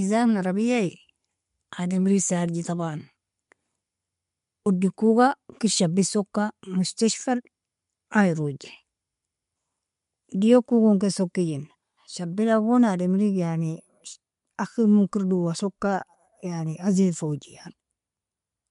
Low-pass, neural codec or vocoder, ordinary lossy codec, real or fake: 10.8 kHz; none; MP3, 64 kbps; real